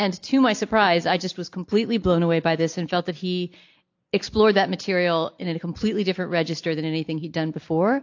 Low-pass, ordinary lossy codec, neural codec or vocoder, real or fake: 7.2 kHz; AAC, 48 kbps; none; real